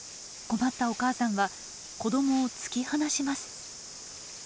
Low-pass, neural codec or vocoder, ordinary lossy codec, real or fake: none; none; none; real